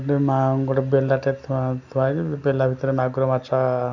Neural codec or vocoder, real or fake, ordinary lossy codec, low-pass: none; real; none; 7.2 kHz